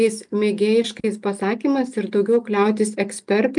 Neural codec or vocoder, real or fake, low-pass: none; real; 10.8 kHz